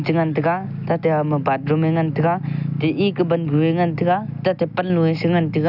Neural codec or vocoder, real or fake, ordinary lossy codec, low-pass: none; real; none; 5.4 kHz